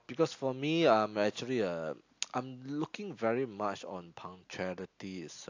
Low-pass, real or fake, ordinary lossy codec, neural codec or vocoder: 7.2 kHz; real; AAC, 48 kbps; none